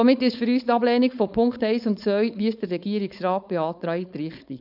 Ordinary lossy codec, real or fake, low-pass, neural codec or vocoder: none; fake; 5.4 kHz; codec, 16 kHz, 4.8 kbps, FACodec